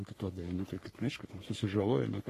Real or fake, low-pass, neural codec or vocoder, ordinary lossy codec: fake; 14.4 kHz; codec, 44.1 kHz, 3.4 kbps, Pupu-Codec; AAC, 48 kbps